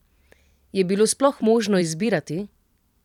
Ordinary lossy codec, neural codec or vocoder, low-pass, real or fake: none; vocoder, 44.1 kHz, 128 mel bands every 256 samples, BigVGAN v2; 19.8 kHz; fake